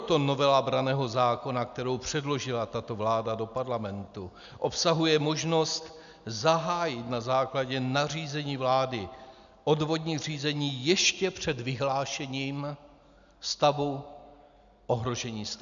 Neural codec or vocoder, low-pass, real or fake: none; 7.2 kHz; real